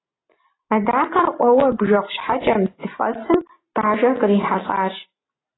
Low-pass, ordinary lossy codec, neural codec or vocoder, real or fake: 7.2 kHz; AAC, 16 kbps; none; real